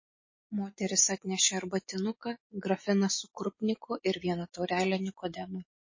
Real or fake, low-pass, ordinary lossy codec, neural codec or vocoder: fake; 7.2 kHz; MP3, 32 kbps; vocoder, 24 kHz, 100 mel bands, Vocos